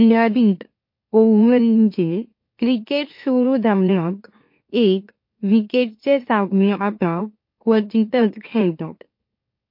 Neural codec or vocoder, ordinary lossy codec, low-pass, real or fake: autoencoder, 44.1 kHz, a latent of 192 numbers a frame, MeloTTS; MP3, 32 kbps; 5.4 kHz; fake